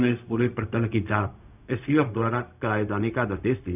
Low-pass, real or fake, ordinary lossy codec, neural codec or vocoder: 3.6 kHz; fake; none; codec, 16 kHz, 0.4 kbps, LongCat-Audio-Codec